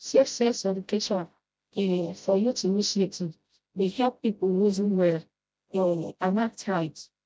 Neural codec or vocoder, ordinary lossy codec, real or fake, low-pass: codec, 16 kHz, 0.5 kbps, FreqCodec, smaller model; none; fake; none